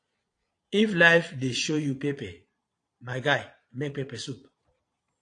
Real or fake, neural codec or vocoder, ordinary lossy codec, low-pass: fake; vocoder, 22.05 kHz, 80 mel bands, Vocos; AAC, 48 kbps; 9.9 kHz